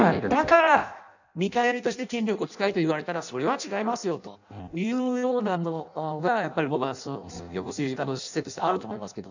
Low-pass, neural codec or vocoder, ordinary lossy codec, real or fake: 7.2 kHz; codec, 16 kHz in and 24 kHz out, 0.6 kbps, FireRedTTS-2 codec; none; fake